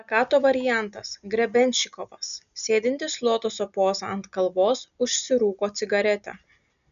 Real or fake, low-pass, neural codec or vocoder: real; 7.2 kHz; none